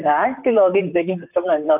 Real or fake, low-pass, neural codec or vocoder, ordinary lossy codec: fake; 3.6 kHz; vocoder, 44.1 kHz, 128 mel bands, Pupu-Vocoder; none